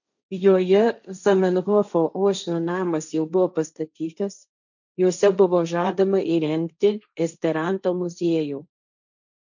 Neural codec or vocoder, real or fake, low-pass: codec, 16 kHz, 1.1 kbps, Voila-Tokenizer; fake; 7.2 kHz